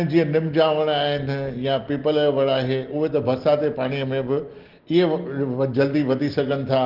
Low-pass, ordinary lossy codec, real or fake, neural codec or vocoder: 5.4 kHz; Opus, 16 kbps; real; none